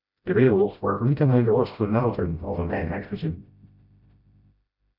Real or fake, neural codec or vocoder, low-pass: fake; codec, 16 kHz, 0.5 kbps, FreqCodec, smaller model; 5.4 kHz